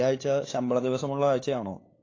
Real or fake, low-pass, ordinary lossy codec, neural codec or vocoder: fake; 7.2 kHz; AAC, 32 kbps; codec, 16 kHz, 8 kbps, FunCodec, trained on LibriTTS, 25 frames a second